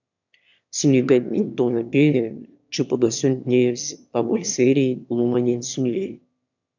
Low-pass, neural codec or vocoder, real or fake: 7.2 kHz; autoencoder, 22.05 kHz, a latent of 192 numbers a frame, VITS, trained on one speaker; fake